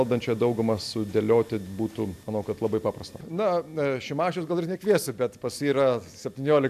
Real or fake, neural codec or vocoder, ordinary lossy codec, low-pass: real; none; AAC, 96 kbps; 14.4 kHz